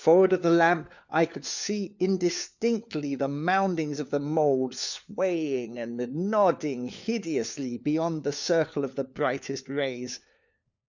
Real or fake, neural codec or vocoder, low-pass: fake; codec, 16 kHz, 4 kbps, FunCodec, trained on LibriTTS, 50 frames a second; 7.2 kHz